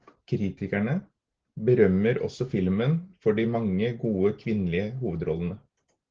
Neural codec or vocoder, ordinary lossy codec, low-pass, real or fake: none; Opus, 16 kbps; 7.2 kHz; real